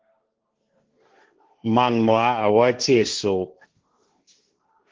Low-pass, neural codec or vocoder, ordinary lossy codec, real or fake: 7.2 kHz; codec, 16 kHz, 1.1 kbps, Voila-Tokenizer; Opus, 16 kbps; fake